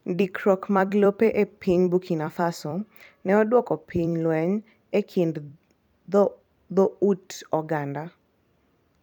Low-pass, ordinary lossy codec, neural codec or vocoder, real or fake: 19.8 kHz; none; vocoder, 44.1 kHz, 128 mel bands every 256 samples, BigVGAN v2; fake